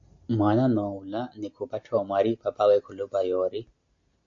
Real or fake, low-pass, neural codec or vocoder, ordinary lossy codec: real; 7.2 kHz; none; AAC, 48 kbps